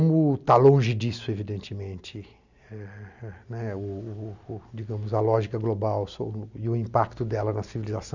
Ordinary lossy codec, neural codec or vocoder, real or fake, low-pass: none; none; real; 7.2 kHz